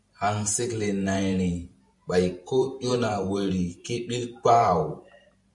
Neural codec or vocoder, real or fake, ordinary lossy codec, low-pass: vocoder, 44.1 kHz, 128 mel bands every 512 samples, BigVGAN v2; fake; MP3, 64 kbps; 10.8 kHz